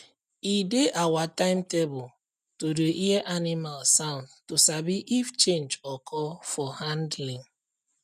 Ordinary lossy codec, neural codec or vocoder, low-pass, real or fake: none; none; 14.4 kHz; real